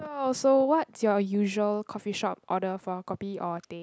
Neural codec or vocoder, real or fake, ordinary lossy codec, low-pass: none; real; none; none